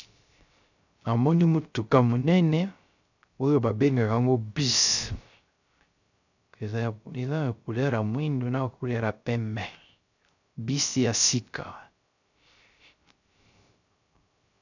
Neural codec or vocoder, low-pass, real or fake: codec, 16 kHz, 0.3 kbps, FocalCodec; 7.2 kHz; fake